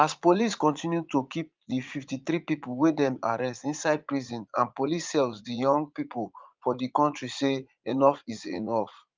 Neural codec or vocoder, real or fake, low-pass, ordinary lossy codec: vocoder, 44.1 kHz, 80 mel bands, Vocos; fake; 7.2 kHz; Opus, 24 kbps